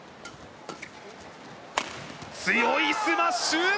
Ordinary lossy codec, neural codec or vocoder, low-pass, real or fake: none; none; none; real